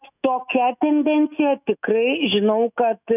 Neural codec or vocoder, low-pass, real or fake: autoencoder, 48 kHz, 128 numbers a frame, DAC-VAE, trained on Japanese speech; 3.6 kHz; fake